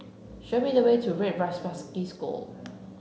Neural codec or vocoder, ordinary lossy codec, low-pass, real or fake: none; none; none; real